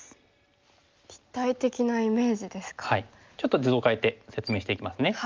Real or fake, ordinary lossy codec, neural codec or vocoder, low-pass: real; Opus, 32 kbps; none; 7.2 kHz